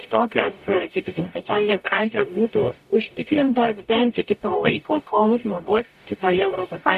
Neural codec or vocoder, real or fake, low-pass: codec, 44.1 kHz, 0.9 kbps, DAC; fake; 14.4 kHz